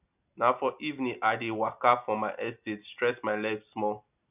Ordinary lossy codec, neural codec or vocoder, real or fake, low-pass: none; none; real; 3.6 kHz